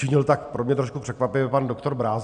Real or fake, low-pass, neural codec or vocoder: real; 9.9 kHz; none